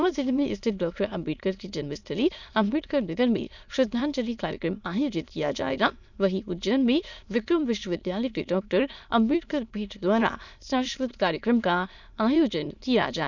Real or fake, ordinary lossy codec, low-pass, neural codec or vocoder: fake; none; 7.2 kHz; autoencoder, 22.05 kHz, a latent of 192 numbers a frame, VITS, trained on many speakers